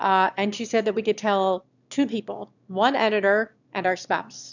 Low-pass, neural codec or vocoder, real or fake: 7.2 kHz; autoencoder, 22.05 kHz, a latent of 192 numbers a frame, VITS, trained on one speaker; fake